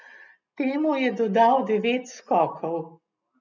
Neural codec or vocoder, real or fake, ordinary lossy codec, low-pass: none; real; none; 7.2 kHz